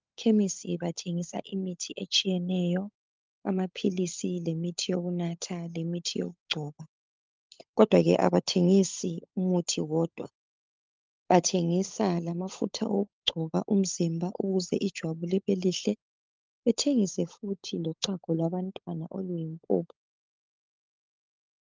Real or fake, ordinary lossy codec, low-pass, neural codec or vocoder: fake; Opus, 24 kbps; 7.2 kHz; codec, 16 kHz, 16 kbps, FunCodec, trained on LibriTTS, 50 frames a second